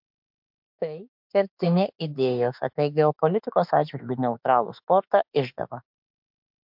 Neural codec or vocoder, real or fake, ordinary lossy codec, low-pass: autoencoder, 48 kHz, 32 numbers a frame, DAC-VAE, trained on Japanese speech; fake; MP3, 48 kbps; 5.4 kHz